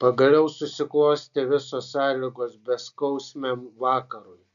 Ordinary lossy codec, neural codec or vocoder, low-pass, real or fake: MP3, 96 kbps; none; 7.2 kHz; real